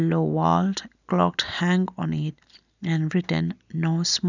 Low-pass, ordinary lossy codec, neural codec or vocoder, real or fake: 7.2 kHz; none; none; real